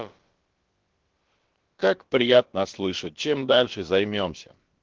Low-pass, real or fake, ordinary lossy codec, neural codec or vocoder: 7.2 kHz; fake; Opus, 16 kbps; codec, 16 kHz, about 1 kbps, DyCAST, with the encoder's durations